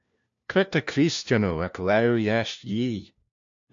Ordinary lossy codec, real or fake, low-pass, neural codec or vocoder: AAC, 64 kbps; fake; 7.2 kHz; codec, 16 kHz, 1 kbps, FunCodec, trained on LibriTTS, 50 frames a second